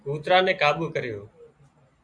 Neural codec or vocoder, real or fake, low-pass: none; real; 9.9 kHz